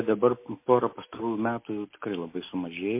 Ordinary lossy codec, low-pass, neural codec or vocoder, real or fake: MP3, 24 kbps; 3.6 kHz; none; real